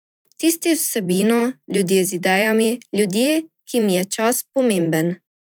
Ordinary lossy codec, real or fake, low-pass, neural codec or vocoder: none; fake; none; vocoder, 44.1 kHz, 128 mel bands every 512 samples, BigVGAN v2